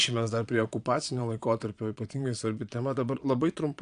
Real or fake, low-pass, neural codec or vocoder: fake; 9.9 kHz; vocoder, 22.05 kHz, 80 mel bands, Vocos